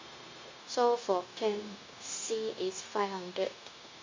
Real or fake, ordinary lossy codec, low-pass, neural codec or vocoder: fake; MP3, 64 kbps; 7.2 kHz; codec, 16 kHz, 0.9 kbps, LongCat-Audio-Codec